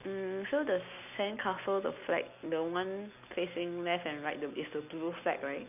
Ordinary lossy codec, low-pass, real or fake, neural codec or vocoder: none; 3.6 kHz; real; none